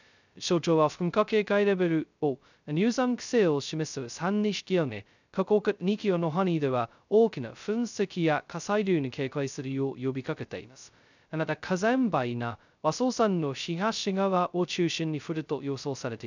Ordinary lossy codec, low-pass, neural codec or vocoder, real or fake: none; 7.2 kHz; codec, 16 kHz, 0.2 kbps, FocalCodec; fake